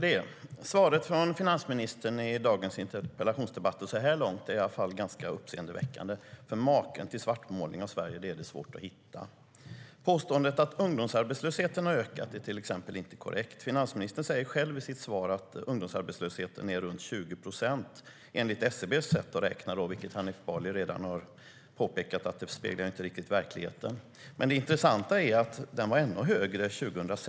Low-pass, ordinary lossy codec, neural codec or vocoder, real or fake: none; none; none; real